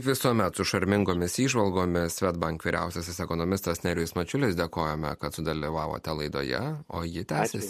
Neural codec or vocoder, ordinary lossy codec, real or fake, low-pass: none; MP3, 64 kbps; real; 14.4 kHz